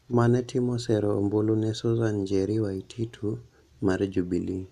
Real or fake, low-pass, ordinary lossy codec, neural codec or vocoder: real; 14.4 kHz; none; none